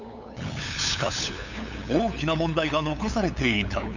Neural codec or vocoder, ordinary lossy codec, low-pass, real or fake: codec, 16 kHz, 8 kbps, FunCodec, trained on LibriTTS, 25 frames a second; none; 7.2 kHz; fake